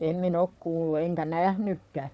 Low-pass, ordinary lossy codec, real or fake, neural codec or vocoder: none; none; fake; codec, 16 kHz, 4 kbps, FunCodec, trained on Chinese and English, 50 frames a second